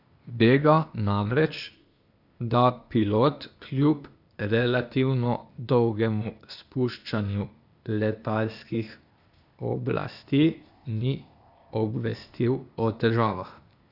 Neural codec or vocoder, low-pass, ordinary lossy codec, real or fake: codec, 16 kHz, 0.8 kbps, ZipCodec; 5.4 kHz; none; fake